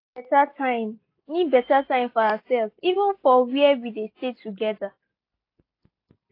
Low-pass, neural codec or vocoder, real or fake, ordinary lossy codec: 5.4 kHz; none; real; AAC, 32 kbps